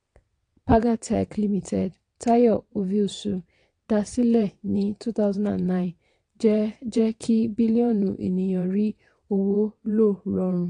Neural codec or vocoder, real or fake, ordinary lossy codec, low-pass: vocoder, 22.05 kHz, 80 mel bands, WaveNeXt; fake; AAC, 48 kbps; 9.9 kHz